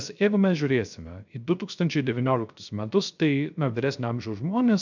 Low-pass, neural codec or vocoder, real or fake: 7.2 kHz; codec, 16 kHz, 0.3 kbps, FocalCodec; fake